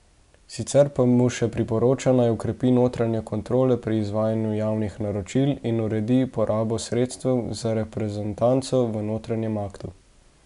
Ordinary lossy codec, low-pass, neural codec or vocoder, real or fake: none; 10.8 kHz; none; real